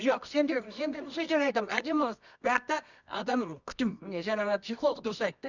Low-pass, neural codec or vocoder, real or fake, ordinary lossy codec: 7.2 kHz; codec, 24 kHz, 0.9 kbps, WavTokenizer, medium music audio release; fake; none